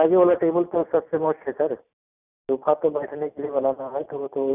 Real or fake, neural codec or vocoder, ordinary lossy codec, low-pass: real; none; none; 3.6 kHz